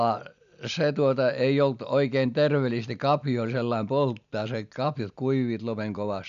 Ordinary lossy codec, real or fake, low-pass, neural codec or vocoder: none; real; 7.2 kHz; none